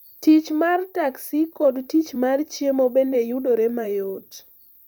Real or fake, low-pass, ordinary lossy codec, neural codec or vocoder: fake; none; none; vocoder, 44.1 kHz, 128 mel bands, Pupu-Vocoder